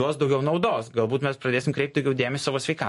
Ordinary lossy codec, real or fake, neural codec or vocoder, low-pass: MP3, 48 kbps; real; none; 14.4 kHz